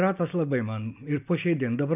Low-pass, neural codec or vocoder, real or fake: 3.6 kHz; none; real